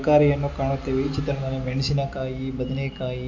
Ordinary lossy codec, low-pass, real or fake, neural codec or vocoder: AAC, 48 kbps; 7.2 kHz; real; none